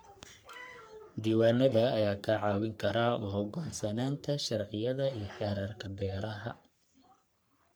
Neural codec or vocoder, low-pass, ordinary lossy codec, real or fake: codec, 44.1 kHz, 3.4 kbps, Pupu-Codec; none; none; fake